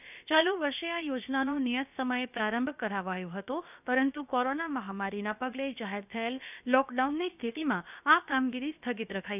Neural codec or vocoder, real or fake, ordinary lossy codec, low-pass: codec, 16 kHz, about 1 kbps, DyCAST, with the encoder's durations; fake; none; 3.6 kHz